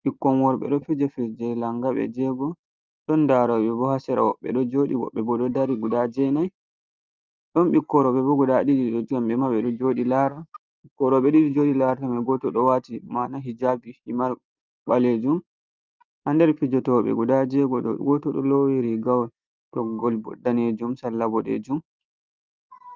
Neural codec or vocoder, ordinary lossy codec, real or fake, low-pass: none; Opus, 32 kbps; real; 7.2 kHz